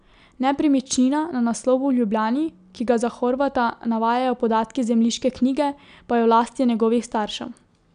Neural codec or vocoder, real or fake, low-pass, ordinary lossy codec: none; real; 9.9 kHz; none